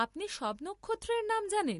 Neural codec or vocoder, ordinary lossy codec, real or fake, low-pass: none; MP3, 48 kbps; real; 10.8 kHz